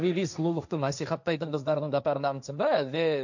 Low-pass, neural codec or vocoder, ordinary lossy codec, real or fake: 7.2 kHz; codec, 16 kHz, 1.1 kbps, Voila-Tokenizer; none; fake